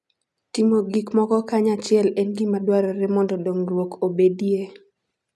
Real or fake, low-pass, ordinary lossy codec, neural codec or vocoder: real; none; none; none